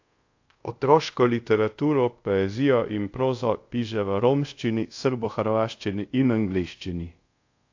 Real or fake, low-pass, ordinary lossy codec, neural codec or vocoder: fake; 7.2 kHz; AAC, 48 kbps; codec, 24 kHz, 0.5 kbps, DualCodec